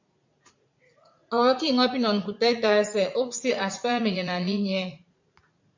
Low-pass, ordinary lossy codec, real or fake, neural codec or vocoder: 7.2 kHz; MP3, 48 kbps; fake; vocoder, 44.1 kHz, 80 mel bands, Vocos